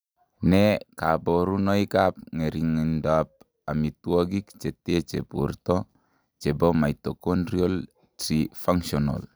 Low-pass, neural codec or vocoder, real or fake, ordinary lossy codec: none; none; real; none